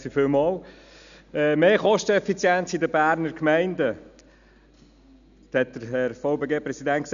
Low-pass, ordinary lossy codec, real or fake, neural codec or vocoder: 7.2 kHz; none; real; none